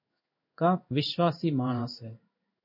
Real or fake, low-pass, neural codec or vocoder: fake; 5.4 kHz; codec, 16 kHz in and 24 kHz out, 1 kbps, XY-Tokenizer